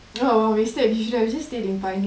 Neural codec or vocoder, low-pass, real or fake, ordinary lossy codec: none; none; real; none